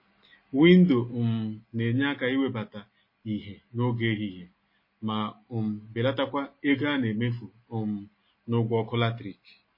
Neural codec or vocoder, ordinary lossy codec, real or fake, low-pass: none; MP3, 24 kbps; real; 5.4 kHz